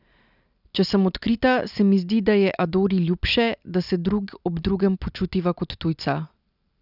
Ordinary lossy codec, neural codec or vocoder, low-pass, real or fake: none; none; 5.4 kHz; real